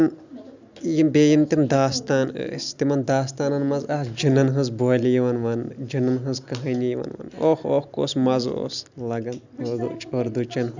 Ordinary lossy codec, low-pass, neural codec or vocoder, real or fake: none; 7.2 kHz; none; real